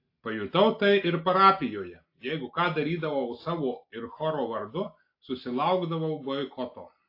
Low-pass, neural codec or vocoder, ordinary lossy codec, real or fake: 5.4 kHz; none; AAC, 32 kbps; real